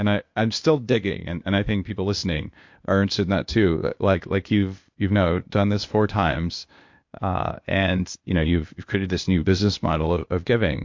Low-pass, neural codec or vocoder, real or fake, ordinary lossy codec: 7.2 kHz; codec, 16 kHz, 0.8 kbps, ZipCodec; fake; MP3, 48 kbps